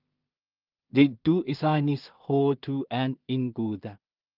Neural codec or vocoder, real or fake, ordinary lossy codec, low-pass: codec, 16 kHz in and 24 kHz out, 0.4 kbps, LongCat-Audio-Codec, two codebook decoder; fake; Opus, 24 kbps; 5.4 kHz